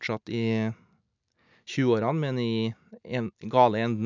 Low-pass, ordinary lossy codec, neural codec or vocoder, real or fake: 7.2 kHz; none; none; real